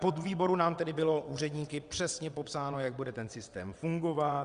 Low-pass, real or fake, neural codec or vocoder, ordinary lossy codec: 9.9 kHz; fake; vocoder, 22.05 kHz, 80 mel bands, WaveNeXt; Opus, 64 kbps